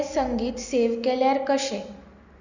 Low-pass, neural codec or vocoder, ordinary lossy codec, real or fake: 7.2 kHz; none; none; real